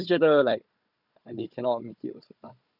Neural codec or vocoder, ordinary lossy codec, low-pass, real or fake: codec, 16 kHz, 4 kbps, FunCodec, trained on Chinese and English, 50 frames a second; none; 5.4 kHz; fake